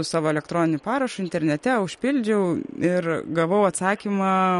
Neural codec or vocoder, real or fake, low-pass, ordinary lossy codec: none; real; 19.8 kHz; MP3, 48 kbps